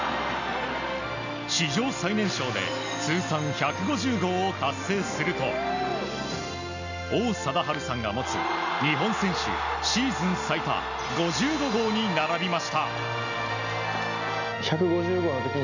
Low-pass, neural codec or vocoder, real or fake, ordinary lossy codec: 7.2 kHz; none; real; none